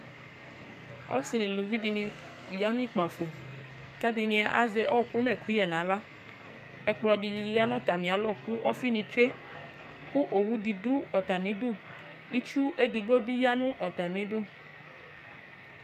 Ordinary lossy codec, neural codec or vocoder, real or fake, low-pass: MP3, 96 kbps; codec, 44.1 kHz, 2.6 kbps, SNAC; fake; 14.4 kHz